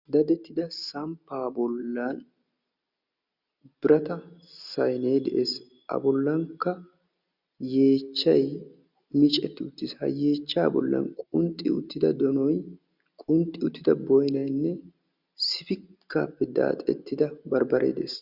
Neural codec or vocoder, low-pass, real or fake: none; 5.4 kHz; real